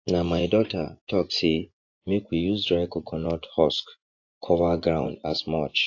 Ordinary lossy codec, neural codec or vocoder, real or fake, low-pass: AAC, 48 kbps; vocoder, 24 kHz, 100 mel bands, Vocos; fake; 7.2 kHz